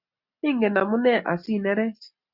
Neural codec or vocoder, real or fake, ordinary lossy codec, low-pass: none; real; Opus, 64 kbps; 5.4 kHz